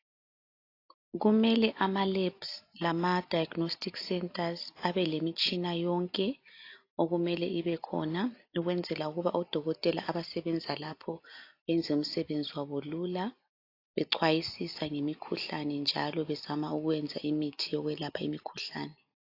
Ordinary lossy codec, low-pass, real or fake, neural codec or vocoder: AAC, 32 kbps; 5.4 kHz; real; none